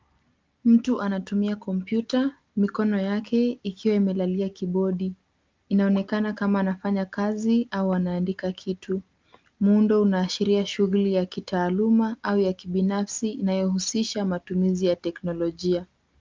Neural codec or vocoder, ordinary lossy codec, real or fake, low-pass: none; Opus, 32 kbps; real; 7.2 kHz